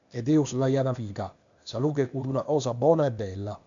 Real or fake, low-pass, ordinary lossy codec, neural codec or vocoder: fake; 7.2 kHz; MP3, 64 kbps; codec, 16 kHz, 0.8 kbps, ZipCodec